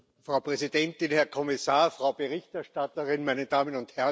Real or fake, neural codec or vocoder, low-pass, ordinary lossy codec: real; none; none; none